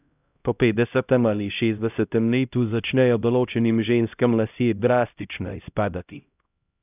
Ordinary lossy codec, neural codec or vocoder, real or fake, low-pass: none; codec, 16 kHz, 0.5 kbps, X-Codec, HuBERT features, trained on LibriSpeech; fake; 3.6 kHz